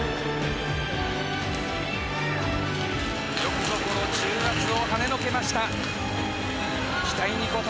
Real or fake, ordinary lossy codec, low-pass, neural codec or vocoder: real; none; none; none